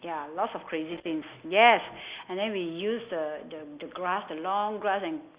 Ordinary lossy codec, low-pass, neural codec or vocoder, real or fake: Opus, 64 kbps; 3.6 kHz; none; real